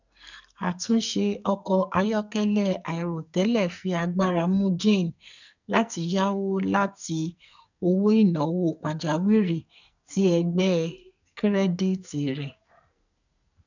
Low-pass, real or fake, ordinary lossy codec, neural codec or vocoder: 7.2 kHz; fake; none; codec, 44.1 kHz, 2.6 kbps, SNAC